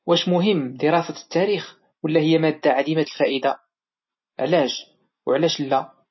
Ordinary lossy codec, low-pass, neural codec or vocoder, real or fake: MP3, 24 kbps; 7.2 kHz; none; real